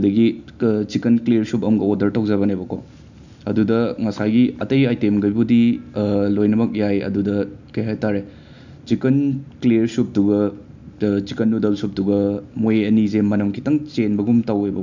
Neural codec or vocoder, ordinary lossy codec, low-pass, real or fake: none; none; 7.2 kHz; real